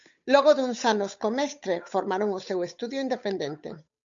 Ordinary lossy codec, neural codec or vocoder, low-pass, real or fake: MP3, 64 kbps; codec, 16 kHz, 8 kbps, FunCodec, trained on Chinese and English, 25 frames a second; 7.2 kHz; fake